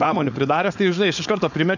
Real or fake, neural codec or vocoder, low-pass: fake; codec, 16 kHz, 4.8 kbps, FACodec; 7.2 kHz